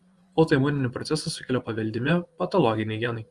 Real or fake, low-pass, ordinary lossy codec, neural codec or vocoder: fake; 10.8 kHz; Opus, 32 kbps; vocoder, 24 kHz, 100 mel bands, Vocos